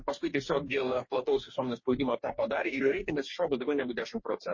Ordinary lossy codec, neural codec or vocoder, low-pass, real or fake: MP3, 32 kbps; codec, 44.1 kHz, 2.6 kbps, DAC; 7.2 kHz; fake